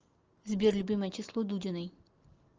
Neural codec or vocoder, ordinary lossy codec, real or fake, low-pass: none; Opus, 24 kbps; real; 7.2 kHz